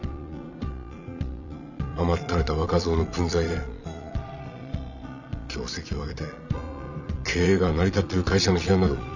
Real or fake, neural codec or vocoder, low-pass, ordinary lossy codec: fake; vocoder, 22.05 kHz, 80 mel bands, Vocos; 7.2 kHz; none